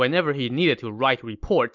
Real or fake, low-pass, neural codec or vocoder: real; 7.2 kHz; none